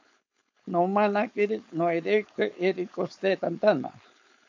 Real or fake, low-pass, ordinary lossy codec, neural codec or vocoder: fake; 7.2 kHz; AAC, 48 kbps; codec, 16 kHz, 4.8 kbps, FACodec